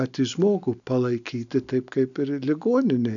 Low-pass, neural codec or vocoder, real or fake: 7.2 kHz; none; real